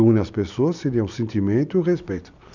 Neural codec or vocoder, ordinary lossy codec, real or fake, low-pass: none; none; real; 7.2 kHz